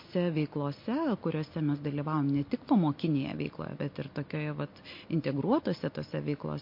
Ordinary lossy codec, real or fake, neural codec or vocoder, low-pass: MP3, 32 kbps; real; none; 5.4 kHz